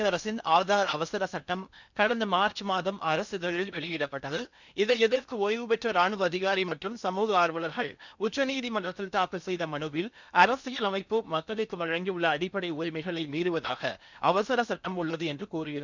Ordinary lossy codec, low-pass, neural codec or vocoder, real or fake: none; 7.2 kHz; codec, 16 kHz in and 24 kHz out, 0.8 kbps, FocalCodec, streaming, 65536 codes; fake